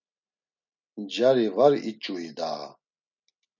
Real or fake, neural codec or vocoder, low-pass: real; none; 7.2 kHz